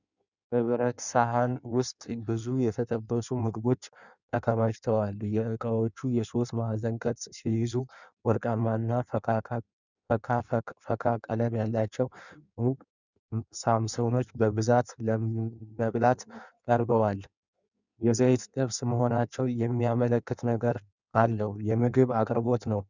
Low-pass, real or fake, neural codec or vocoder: 7.2 kHz; fake; codec, 16 kHz in and 24 kHz out, 1.1 kbps, FireRedTTS-2 codec